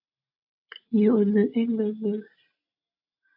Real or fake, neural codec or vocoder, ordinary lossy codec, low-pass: real; none; AAC, 48 kbps; 5.4 kHz